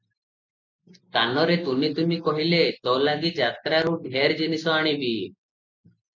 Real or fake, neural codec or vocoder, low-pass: real; none; 7.2 kHz